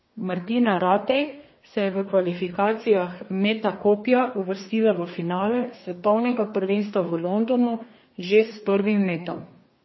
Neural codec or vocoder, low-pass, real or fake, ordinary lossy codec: codec, 24 kHz, 1 kbps, SNAC; 7.2 kHz; fake; MP3, 24 kbps